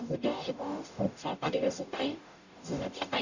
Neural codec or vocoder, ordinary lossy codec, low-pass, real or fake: codec, 44.1 kHz, 0.9 kbps, DAC; none; 7.2 kHz; fake